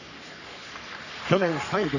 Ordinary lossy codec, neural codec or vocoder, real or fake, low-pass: none; codec, 44.1 kHz, 3.4 kbps, Pupu-Codec; fake; 7.2 kHz